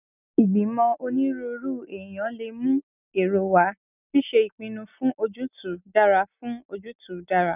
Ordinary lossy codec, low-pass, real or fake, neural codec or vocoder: none; 3.6 kHz; real; none